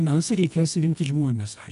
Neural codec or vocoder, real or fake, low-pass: codec, 24 kHz, 0.9 kbps, WavTokenizer, medium music audio release; fake; 10.8 kHz